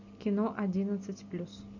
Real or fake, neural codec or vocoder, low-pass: real; none; 7.2 kHz